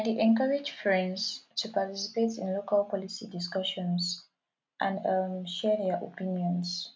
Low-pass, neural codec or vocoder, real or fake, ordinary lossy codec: none; none; real; none